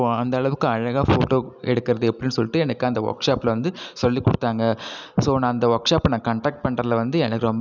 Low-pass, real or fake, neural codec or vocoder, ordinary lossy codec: 7.2 kHz; real; none; none